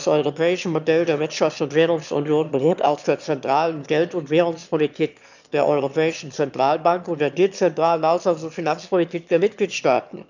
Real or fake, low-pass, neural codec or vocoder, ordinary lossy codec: fake; 7.2 kHz; autoencoder, 22.05 kHz, a latent of 192 numbers a frame, VITS, trained on one speaker; none